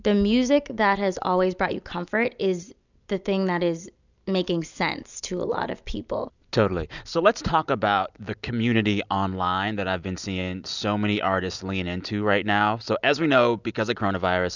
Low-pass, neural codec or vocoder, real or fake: 7.2 kHz; none; real